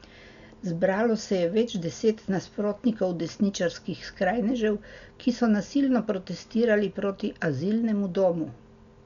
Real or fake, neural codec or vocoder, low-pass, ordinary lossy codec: real; none; 7.2 kHz; none